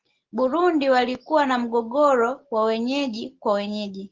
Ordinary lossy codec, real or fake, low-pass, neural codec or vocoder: Opus, 16 kbps; real; 7.2 kHz; none